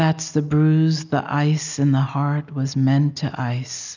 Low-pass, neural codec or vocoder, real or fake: 7.2 kHz; none; real